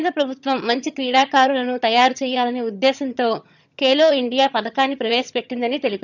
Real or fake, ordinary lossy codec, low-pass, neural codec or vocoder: fake; none; 7.2 kHz; vocoder, 22.05 kHz, 80 mel bands, HiFi-GAN